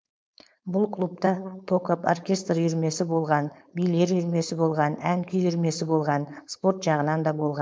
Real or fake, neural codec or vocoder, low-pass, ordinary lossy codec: fake; codec, 16 kHz, 4.8 kbps, FACodec; none; none